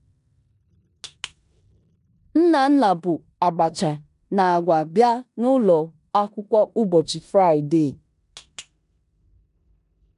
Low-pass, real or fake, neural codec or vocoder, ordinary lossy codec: 10.8 kHz; fake; codec, 16 kHz in and 24 kHz out, 0.9 kbps, LongCat-Audio-Codec, four codebook decoder; none